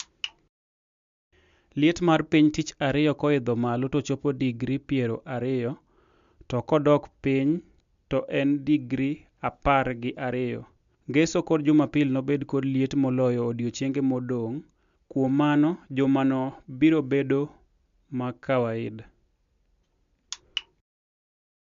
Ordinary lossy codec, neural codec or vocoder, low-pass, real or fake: MP3, 64 kbps; none; 7.2 kHz; real